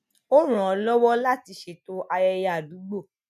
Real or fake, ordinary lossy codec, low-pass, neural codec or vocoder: real; none; 14.4 kHz; none